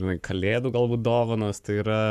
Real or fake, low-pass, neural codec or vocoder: fake; 14.4 kHz; codec, 44.1 kHz, 7.8 kbps, Pupu-Codec